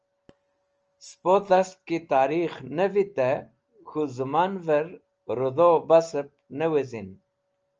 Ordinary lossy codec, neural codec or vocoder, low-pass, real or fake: Opus, 24 kbps; none; 7.2 kHz; real